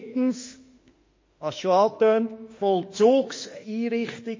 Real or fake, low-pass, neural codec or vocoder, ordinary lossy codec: fake; 7.2 kHz; autoencoder, 48 kHz, 32 numbers a frame, DAC-VAE, trained on Japanese speech; MP3, 32 kbps